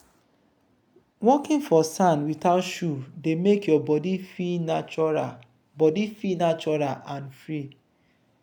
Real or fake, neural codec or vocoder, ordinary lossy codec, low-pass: real; none; none; none